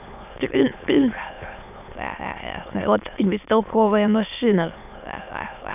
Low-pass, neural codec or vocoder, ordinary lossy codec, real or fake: 3.6 kHz; autoencoder, 22.05 kHz, a latent of 192 numbers a frame, VITS, trained on many speakers; none; fake